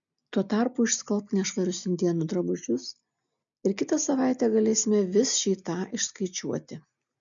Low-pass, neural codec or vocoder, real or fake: 7.2 kHz; none; real